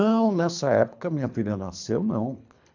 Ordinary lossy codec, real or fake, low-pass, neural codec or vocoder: none; fake; 7.2 kHz; codec, 24 kHz, 3 kbps, HILCodec